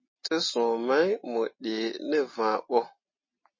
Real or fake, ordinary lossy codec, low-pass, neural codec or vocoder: real; MP3, 32 kbps; 7.2 kHz; none